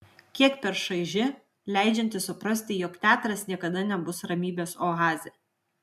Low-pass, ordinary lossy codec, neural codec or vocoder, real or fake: 14.4 kHz; MP3, 96 kbps; none; real